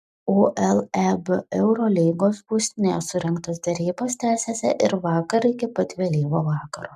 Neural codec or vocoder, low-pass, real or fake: none; 14.4 kHz; real